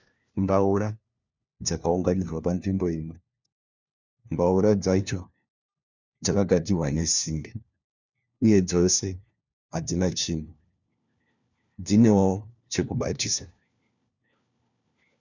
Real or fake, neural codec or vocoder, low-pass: fake; codec, 16 kHz, 1 kbps, FunCodec, trained on LibriTTS, 50 frames a second; 7.2 kHz